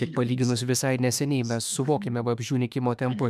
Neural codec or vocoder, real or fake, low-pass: autoencoder, 48 kHz, 32 numbers a frame, DAC-VAE, trained on Japanese speech; fake; 14.4 kHz